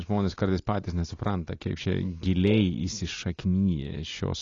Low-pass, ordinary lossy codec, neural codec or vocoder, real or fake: 7.2 kHz; AAC, 32 kbps; none; real